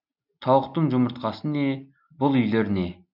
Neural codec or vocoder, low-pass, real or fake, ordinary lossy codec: none; 5.4 kHz; real; none